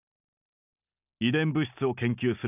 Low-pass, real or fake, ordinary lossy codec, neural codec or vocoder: 3.6 kHz; real; none; none